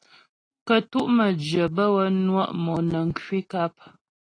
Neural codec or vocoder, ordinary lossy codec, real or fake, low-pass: none; AAC, 32 kbps; real; 9.9 kHz